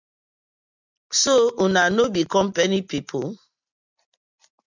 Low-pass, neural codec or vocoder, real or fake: 7.2 kHz; none; real